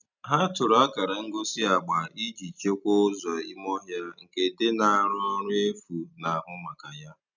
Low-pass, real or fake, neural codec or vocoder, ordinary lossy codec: 7.2 kHz; real; none; none